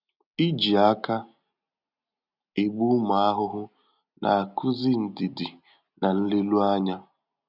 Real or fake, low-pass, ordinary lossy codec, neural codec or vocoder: real; 5.4 kHz; none; none